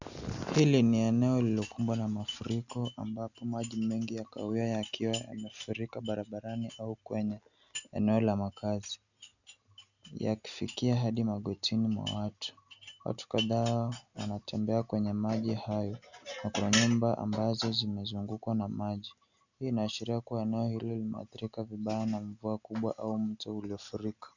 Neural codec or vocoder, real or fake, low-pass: none; real; 7.2 kHz